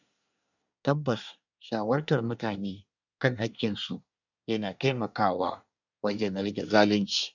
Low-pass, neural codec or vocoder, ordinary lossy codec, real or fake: 7.2 kHz; codec, 24 kHz, 1 kbps, SNAC; none; fake